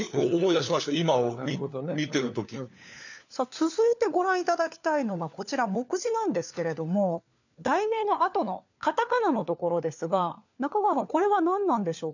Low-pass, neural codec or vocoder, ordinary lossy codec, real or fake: 7.2 kHz; codec, 16 kHz, 4 kbps, FunCodec, trained on LibriTTS, 50 frames a second; none; fake